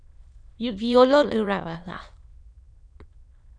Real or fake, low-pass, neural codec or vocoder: fake; 9.9 kHz; autoencoder, 22.05 kHz, a latent of 192 numbers a frame, VITS, trained on many speakers